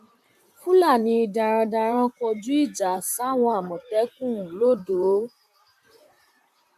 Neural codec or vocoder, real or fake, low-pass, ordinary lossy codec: vocoder, 44.1 kHz, 128 mel bands, Pupu-Vocoder; fake; 14.4 kHz; none